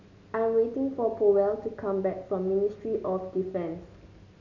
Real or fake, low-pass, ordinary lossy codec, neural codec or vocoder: real; 7.2 kHz; none; none